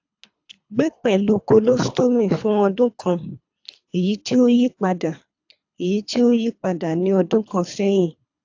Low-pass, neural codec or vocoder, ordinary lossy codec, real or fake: 7.2 kHz; codec, 24 kHz, 3 kbps, HILCodec; AAC, 48 kbps; fake